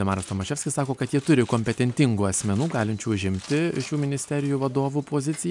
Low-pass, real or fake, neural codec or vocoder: 10.8 kHz; real; none